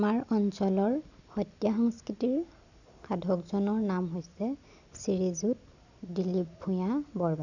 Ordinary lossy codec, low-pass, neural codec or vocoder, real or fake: none; 7.2 kHz; none; real